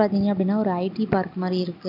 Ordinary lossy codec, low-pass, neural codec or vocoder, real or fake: none; 5.4 kHz; none; real